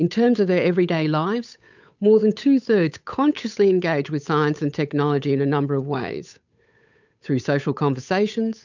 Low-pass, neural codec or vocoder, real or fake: 7.2 kHz; codec, 16 kHz, 8 kbps, FunCodec, trained on Chinese and English, 25 frames a second; fake